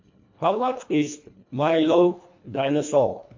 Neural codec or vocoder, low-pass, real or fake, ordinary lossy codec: codec, 24 kHz, 1.5 kbps, HILCodec; 7.2 kHz; fake; MP3, 48 kbps